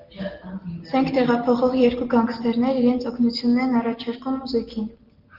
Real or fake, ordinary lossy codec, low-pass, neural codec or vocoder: real; Opus, 16 kbps; 5.4 kHz; none